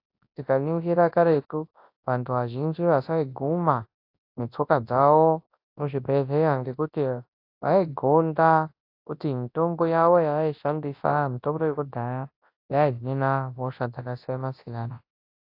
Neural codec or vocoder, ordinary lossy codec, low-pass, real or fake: codec, 24 kHz, 0.9 kbps, WavTokenizer, large speech release; AAC, 32 kbps; 5.4 kHz; fake